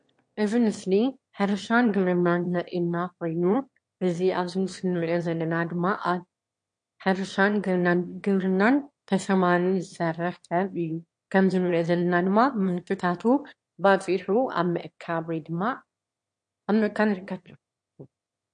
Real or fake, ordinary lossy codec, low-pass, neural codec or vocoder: fake; MP3, 48 kbps; 9.9 kHz; autoencoder, 22.05 kHz, a latent of 192 numbers a frame, VITS, trained on one speaker